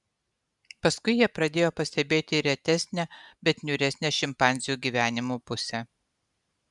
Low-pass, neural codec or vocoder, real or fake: 10.8 kHz; none; real